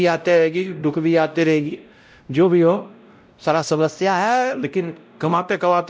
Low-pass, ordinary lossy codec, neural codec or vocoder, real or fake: none; none; codec, 16 kHz, 0.5 kbps, X-Codec, WavLM features, trained on Multilingual LibriSpeech; fake